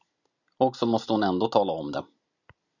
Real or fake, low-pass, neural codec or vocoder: fake; 7.2 kHz; vocoder, 44.1 kHz, 128 mel bands every 256 samples, BigVGAN v2